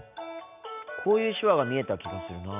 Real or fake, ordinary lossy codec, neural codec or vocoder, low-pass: real; none; none; 3.6 kHz